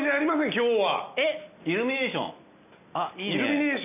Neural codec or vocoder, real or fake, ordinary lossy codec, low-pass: none; real; none; 3.6 kHz